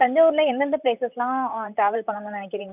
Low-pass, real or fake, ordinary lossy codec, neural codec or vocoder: 3.6 kHz; fake; none; vocoder, 44.1 kHz, 128 mel bands, Pupu-Vocoder